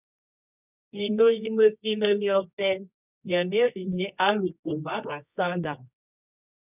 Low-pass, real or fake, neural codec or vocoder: 3.6 kHz; fake; codec, 24 kHz, 0.9 kbps, WavTokenizer, medium music audio release